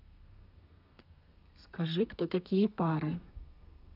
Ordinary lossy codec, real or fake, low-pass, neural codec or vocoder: none; fake; 5.4 kHz; codec, 32 kHz, 1.9 kbps, SNAC